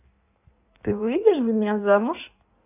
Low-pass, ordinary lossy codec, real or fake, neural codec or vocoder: 3.6 kHz; none; fake; codec, 16 kHz in and 24 kHz out, 1.1 kbps, FireRedTTS-2 codec